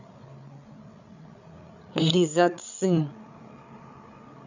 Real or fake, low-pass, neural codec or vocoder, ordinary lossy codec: fake; 7.2 kHz; codec, 16 kHz, 8 kbps, FreqCodec, larger model; none